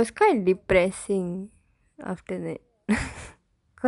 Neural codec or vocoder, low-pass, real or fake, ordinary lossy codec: none; 10.8 kHz; real; none